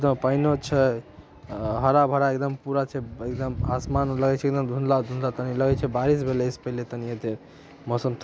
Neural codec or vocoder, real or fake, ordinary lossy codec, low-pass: none; real; none; none